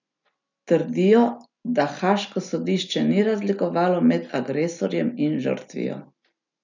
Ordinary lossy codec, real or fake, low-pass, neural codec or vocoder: none; real; 7.2 kHz; none